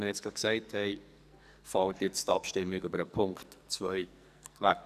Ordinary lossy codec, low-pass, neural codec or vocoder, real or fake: none; 14.4 kHz; codec, 44.1 kHz, 2.6 kbps, SNAC; fake